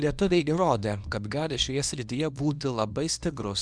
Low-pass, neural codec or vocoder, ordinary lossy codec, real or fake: 9.9 kHz; codec, 24 kHz, 0.9 kbps, WavTokenizer, small release; Opus, 64 kbps; fake